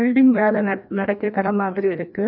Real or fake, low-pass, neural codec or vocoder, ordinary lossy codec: fake; 5.4 kHz; codec, 16 kHz, 1 kbps, FreqCodec, larger model; none